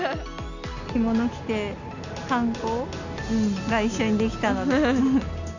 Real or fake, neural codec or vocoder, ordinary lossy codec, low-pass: real; none; none; 7.2 kHz